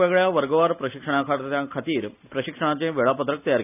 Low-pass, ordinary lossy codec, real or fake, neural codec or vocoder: 3.6 kHz; none; real; none